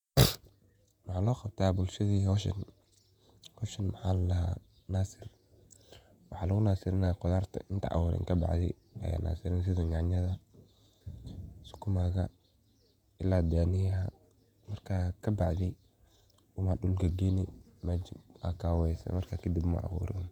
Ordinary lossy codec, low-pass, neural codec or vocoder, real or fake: none; 19.8 kHz; vocoder, 48 kHz, 128 mel bands, Vocos; fake